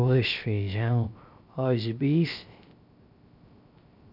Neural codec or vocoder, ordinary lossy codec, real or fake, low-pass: codec, 16 kHz, 0.3 kbps, FocalCodec; none; fake; 5.4 kHz